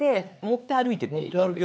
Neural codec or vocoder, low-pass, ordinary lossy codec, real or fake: codec, 16 kHz, 4 kbps, X-Codec, HuBERT features, trained on LibriSpeech; none; none; fake